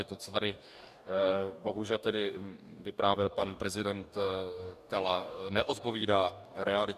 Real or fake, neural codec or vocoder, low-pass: fake; codec, 44.1 kHz, 2.6 kbps, DAC; 14.4 kHz